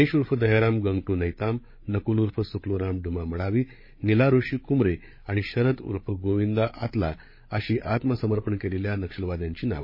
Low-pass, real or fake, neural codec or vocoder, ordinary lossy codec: 5.4 kHz; fake; codec, 16 kHz, 8 kbps, FreqCodec, larger model; MP3, 24 kbps